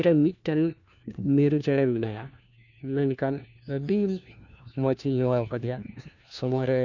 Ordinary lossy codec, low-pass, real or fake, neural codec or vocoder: none; 7.2 kHz; fake; codec, 16 kHz, 1 kbps, FunCodec, trained on LibriTTS, 50 frames a second